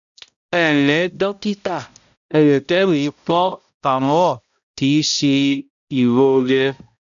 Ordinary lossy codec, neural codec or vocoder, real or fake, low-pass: none; codec, 16 kHz, 0.5 kbps, X-Codec, HuBERT features, trained on balanced general audio; fake; 7.2 kHz